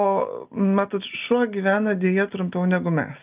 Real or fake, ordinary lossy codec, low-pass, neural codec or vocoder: real; Opus, 24 kbps; 3.6 kHz; none